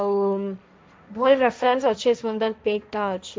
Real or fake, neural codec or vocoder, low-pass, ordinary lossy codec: fake; codec, 16 kHz, 1.1 kbps, Voila-Tokenizer; none; none